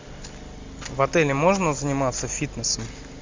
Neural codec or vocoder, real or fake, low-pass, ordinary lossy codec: none; real; 7.2 kHz; MP3, 64 kbps